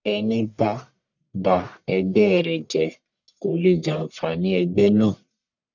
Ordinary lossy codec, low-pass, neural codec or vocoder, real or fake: none; 7.2 kHz; codec, 44.1 kHz, 1.7 kbps, Pupu-Codec; fake